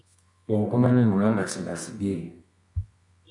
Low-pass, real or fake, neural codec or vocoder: 10.8 kHz; fake; codec, 24 kHz, 0.9 kbps, WavTokenizer, medium music audio release